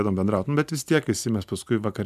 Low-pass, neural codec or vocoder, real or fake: 14.4 kHz; none; real